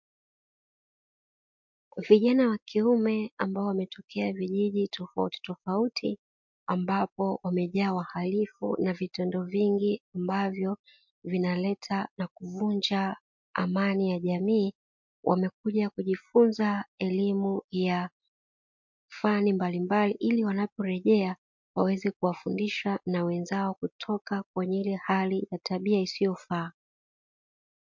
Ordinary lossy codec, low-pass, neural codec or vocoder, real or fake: MP3, 48 kbps; 7.2 kHz; none; real